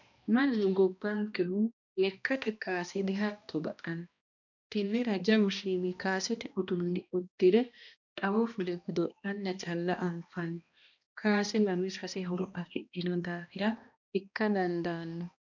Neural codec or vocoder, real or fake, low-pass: codec, 16 kHz, 1 kbps, X-Codec, HuBERT features, trained on balanced general audio; fake; 7.2 kHz